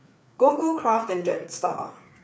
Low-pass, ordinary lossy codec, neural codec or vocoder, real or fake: none; none; codec, 16 kHz, 4 kbps, FreqCodec, larger model; fake